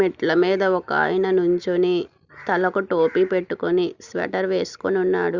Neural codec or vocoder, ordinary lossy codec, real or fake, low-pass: none; Opus, 64 kbps; real; 7.2 kHz